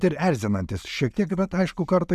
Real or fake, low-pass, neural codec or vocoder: real; 14.4 kHz; none